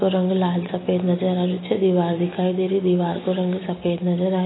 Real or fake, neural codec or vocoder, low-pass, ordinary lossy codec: real; none; 7.2 kHz; AAC, 16 kbps